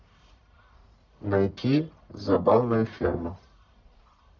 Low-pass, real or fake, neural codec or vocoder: 7.2 kHz; fake; codec, 44.1 kHz, 1.7 kbps, Pupu-Codec